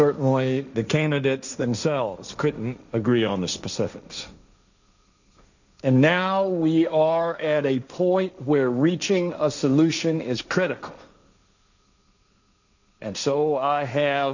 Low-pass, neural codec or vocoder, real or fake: 7.2 kHz; codec, 16 kHz, 1.1 kbps, Voila-Tokenizer; fake